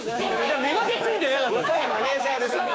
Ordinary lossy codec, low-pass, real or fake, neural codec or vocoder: none; none; fake; codec, 16 kHz, 6 kbps, DAC